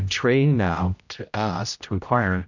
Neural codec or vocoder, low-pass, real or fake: codec, 16 kHz, 0.5 kbps, X-Codec, HuBERT features, trained on general audio; 7.2 kHz; fake